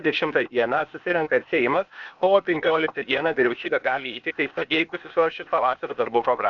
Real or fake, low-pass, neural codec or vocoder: fake; 7.2 kHz; codec, 16 kHz, 0.8 kbps, ZipCodec